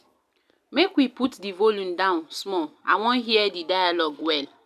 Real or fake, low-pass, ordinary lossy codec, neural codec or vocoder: real; 14.4 kHz; none; none